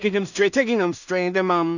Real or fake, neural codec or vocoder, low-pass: fake; codec, 16 kHz in and 24 kHz out, 0.4 kbps, LongCat-Audio-Codec, two codebook decoder; 7.2 kHz